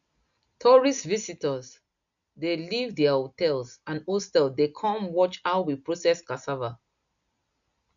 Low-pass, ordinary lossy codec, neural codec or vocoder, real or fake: 7.2 kHz; none; none; real